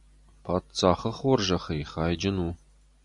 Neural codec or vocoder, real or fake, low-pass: none; real; 10.8 kHz